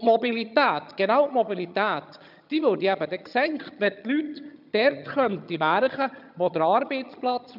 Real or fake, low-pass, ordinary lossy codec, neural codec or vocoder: fake; 5.4 kHz; none; vocoder, 22.05 kHz, 80 mel bands, HiFi-GAN